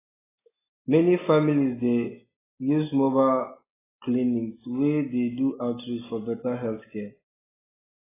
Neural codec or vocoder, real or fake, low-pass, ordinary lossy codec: none; real; 3.6 kHz; AAC, 16 kbps